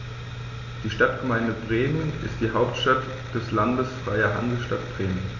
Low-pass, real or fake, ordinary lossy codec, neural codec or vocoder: 7.2 kHz; real; none; none